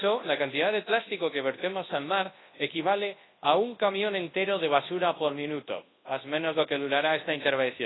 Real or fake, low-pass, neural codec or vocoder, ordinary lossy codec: fake; 7.2 kHz; codec, 24 kHz, 0.9 kbps, WavTokenizer, large speech release; AAC, 16 kbps